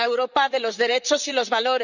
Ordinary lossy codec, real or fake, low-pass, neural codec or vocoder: none; fake; 7.2 kHz; vocoder, 44.1 kHz, 128 mel bands, Pupu-Vocoder